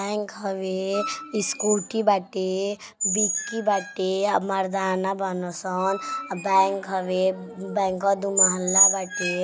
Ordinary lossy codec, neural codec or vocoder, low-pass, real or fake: none; none; none; real